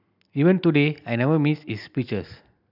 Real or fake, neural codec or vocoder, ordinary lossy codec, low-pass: real; none; AAC, 48 kbps; 5.4 kHz